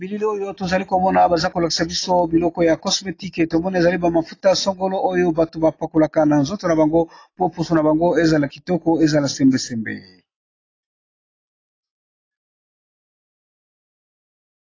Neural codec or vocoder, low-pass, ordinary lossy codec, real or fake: none; 7.2 kHz; AAC, 32 kbps; real